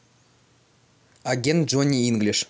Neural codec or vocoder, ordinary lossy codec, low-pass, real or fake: none; none; none; real